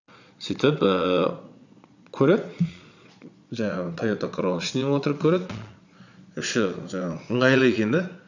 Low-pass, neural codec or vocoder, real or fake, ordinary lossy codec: 7.2 kHz; vocoder, 22.05 kHz, 80 mel bands, Vocos; fake; none